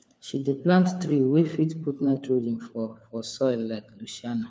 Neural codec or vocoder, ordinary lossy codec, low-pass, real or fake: codec, 16 kHz, 4 kbps, FunCodec, trained on LibriTTS, 50 frames a second; none; none; fake